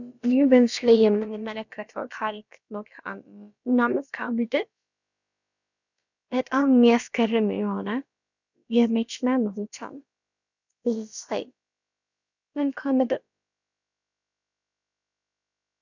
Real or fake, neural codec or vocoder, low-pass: fake; codec, 16 kHz, about 1 kbps, DyCAST, with the encoder's durations; 7.2 kHz